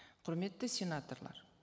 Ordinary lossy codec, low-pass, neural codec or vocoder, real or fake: none; none; none; real